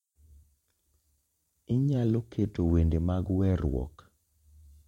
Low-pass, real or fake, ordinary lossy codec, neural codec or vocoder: 19.8 kHz; fake; MP3, 64 kbps; vocoder, 44.1 kHz, 128 mel bands every 512 samples, BigVGAN v2